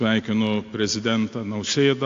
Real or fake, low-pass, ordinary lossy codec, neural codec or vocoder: real; 7.2 kHz; AAC, 48 kbps; none